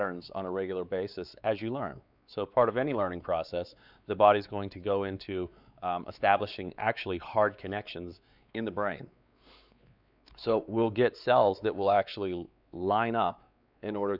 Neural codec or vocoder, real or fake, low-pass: codec, 16 kHz, 4 kbps, X-Codec, WavLM features, trained on Multilingual LibriSpeech; fake; 5.4 kHz